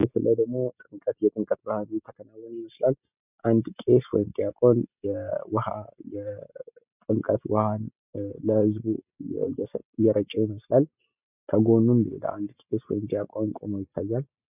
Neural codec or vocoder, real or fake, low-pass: none; real; 3.6 kHz